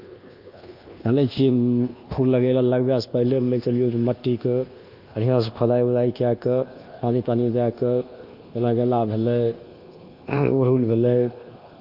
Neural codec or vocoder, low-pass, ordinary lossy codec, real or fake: codec, 24 kHz, 1.2 kbps, DualCodec; 5.4 kHz; Opus, 32 kbps; fake